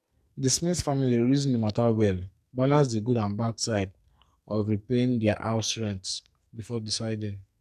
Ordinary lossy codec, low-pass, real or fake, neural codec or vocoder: none; 14.4 kHz; fake; codec, 44.1 kHz, 2.6 kbps, SNAC